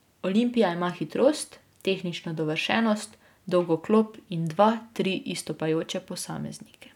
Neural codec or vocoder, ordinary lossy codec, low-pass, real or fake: none; none; 19.8 kHz; real